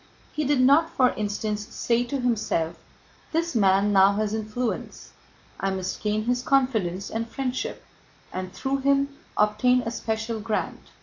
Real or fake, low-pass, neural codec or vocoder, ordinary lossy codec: real; 7.2 kHz; none; AAC, 48 kbps